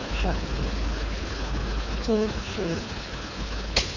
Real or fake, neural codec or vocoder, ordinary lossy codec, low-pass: fake; codec, 24 kHz, 3 kbps, HILCodec; none; 7.2 kHz